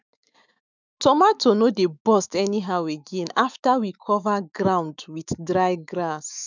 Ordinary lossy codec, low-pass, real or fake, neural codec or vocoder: none; 7.2 kHz; fake; autoencoder, 48 kHz, 128 numbers a frame, DAC-VAE, trained on Japanese speech